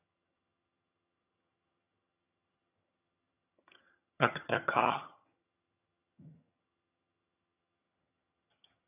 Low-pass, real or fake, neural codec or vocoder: 3.6 kHz; fake; vocoder, 22.05 kHz, 80 mel bands, HiFi-GAN